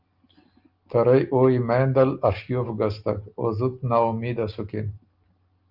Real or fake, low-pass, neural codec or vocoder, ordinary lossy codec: real; 5.4 kHz; none; Opus, 24 kbps